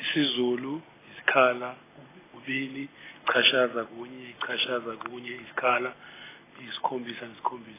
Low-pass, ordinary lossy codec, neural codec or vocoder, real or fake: 3.6 kHz; AAC, 16 kbps; none; real